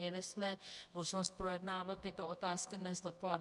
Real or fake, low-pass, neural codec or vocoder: fake; 10.8 kHz; codec, 24 kHz, 0.9 kbps, WavTokenizer, medium music audio release